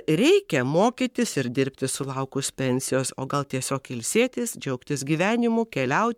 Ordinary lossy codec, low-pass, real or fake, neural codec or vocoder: MP3, 96 kbps; 19.8 kHz; fake; codec, 44.1 kHz, 7.8 kbps, Pupu-Codec